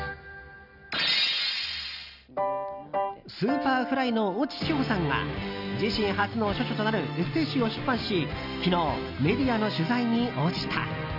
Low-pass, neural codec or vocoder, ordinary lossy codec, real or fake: 5.4 kHz; none; none; real